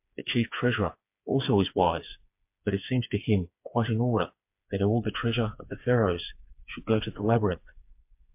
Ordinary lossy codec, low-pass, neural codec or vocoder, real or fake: MP3, 32 kbps; 3.6 kHz; codec, 16 kHz, 4 kbps, FreqCodec, smaller model; fake